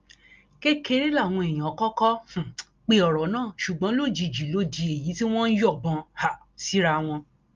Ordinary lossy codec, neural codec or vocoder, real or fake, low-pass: Opus, 32 kbps; none; real; 7.2 kHz